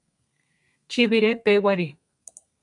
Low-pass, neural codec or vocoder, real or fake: 10.8 kHz; codec, 32 kHz, 1.9 kbps, SNAC; fake